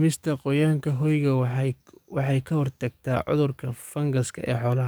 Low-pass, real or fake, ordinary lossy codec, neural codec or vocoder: none; fake; none; codec, 44.1 kHz, 7.8 kbps, DAC